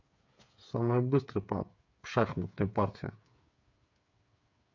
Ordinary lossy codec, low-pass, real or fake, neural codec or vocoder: MP3, 64 kbps; 7.2 kHz; fake; codec, 16 kHz, 8 kbps, FreqCodec, smaller model